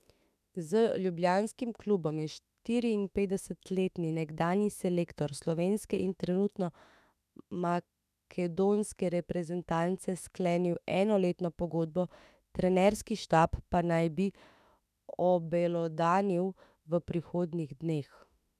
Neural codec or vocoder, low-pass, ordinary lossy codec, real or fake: autoencoder, 48 kHz, 32 numbers a frame, DAC-VAE, trained on Japanese speech; 14.4 kHz; none; fake